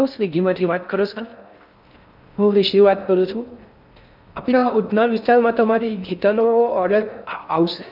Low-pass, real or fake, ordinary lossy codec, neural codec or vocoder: 5.4 kHz; fake; none; codec, 16 kHz in and 24 kHz out, 0.6 kbps, FocalCodec, streaming, 4096 codes